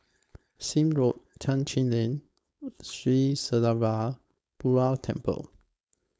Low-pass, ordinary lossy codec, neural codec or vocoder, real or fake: none; none; codec, 16 kHz, 4.8 kbps, FACodec; fake